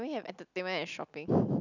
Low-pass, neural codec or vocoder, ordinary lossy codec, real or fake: 7.2 kHz; none; none; real